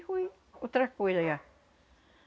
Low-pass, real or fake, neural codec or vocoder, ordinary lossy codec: none; real; none; none